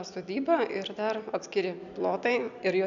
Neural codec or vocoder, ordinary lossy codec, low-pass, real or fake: none; MP3, 96 kbps; 7.2 kHz; real